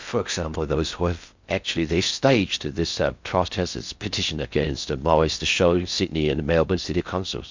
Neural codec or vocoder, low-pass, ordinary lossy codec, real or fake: codec, 16 kHz in and 24 kHz out, 0.6 kbps, FocalCodec, streaming, 4096 codes; 7.2 kHz; MP3, 64 kbps; fake